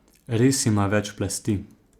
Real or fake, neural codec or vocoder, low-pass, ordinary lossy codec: real; none; 19.8 kHz; Opus, 64 kbps